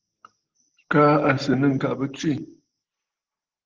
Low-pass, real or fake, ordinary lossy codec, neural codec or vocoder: 7.2 kHz; real; Opus, 16 kbps; none